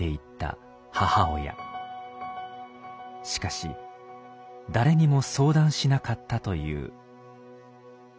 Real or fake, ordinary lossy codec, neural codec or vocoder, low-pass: real; none; none; none